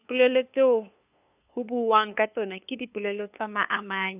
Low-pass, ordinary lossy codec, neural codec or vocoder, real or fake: 3.6 kHz; none; codec, 16 kHz, 2 kbps, FunCodec, trained on LibriTTS, 25 frames a second; fake